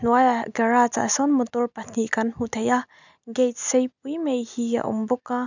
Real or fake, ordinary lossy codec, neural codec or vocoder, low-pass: real; none; none; 7.2 kHz